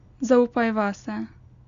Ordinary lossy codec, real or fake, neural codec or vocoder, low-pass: none; real; none; 7.2 kHz